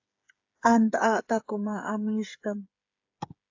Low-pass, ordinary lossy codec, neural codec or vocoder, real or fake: 7.2 kHz; AAC, 48 kbps; codec, 16 kHz, 8 kbps, FreqCodec, smaller model; fake